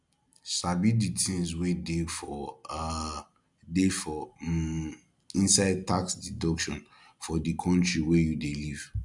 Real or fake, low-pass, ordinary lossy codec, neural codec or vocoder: real; 10.8 kHz; none; none